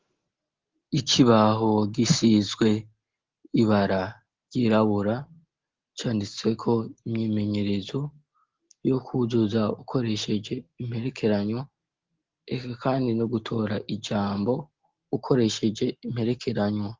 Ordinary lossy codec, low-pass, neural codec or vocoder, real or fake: Opus, 16 kbps; 7.2 kHz; none; real